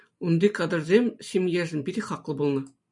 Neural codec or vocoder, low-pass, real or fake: none; 10.8 kHz; real